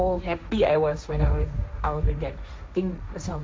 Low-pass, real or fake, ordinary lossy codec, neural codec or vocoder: none; fake; none; codec, 16 kHz, 1.1 kbps, Voila-Tokenizer